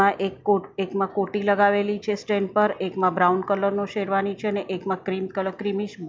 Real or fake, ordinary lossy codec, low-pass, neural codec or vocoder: real; none; none; none